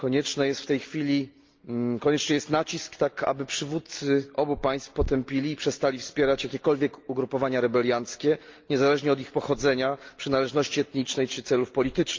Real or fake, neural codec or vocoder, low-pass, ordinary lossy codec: real; none; 7.2 kHz; Opus, 32 kbps